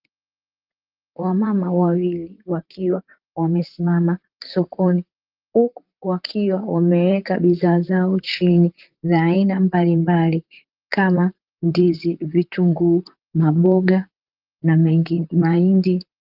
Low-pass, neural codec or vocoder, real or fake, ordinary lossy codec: 5.4 kHz; vocoder, 44.1 kHz, 128 mel bands, Pupu-Vocoder; fake; Opus, 24 kbps